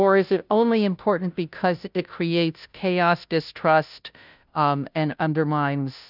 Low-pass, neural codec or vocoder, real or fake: 5.4 kHz; codec, 16 kHz, 0.5 kbps, FunCodec, trained on Chinese and English, 25 frames a second; fake